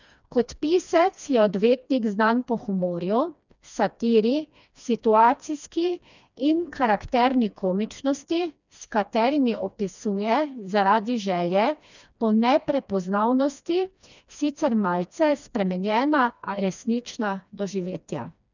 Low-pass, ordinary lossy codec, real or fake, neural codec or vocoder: 7.2 kHz; none; fake; codec, 16 kHz, 2 kbps, FreqCodec, smaller model